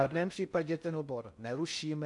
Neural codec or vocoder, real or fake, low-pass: codec, 16 kHz in and 24 kHz out, 0.6 kbps, FocalCodec, streaming, 4096 codes; fake; 10.8 kHz